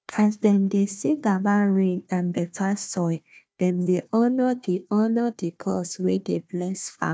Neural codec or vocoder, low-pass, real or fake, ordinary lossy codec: codec, 16 kHz, 1 kbps, FunCodec, trained on Chinese and English, 50 frames a second; none; fake; none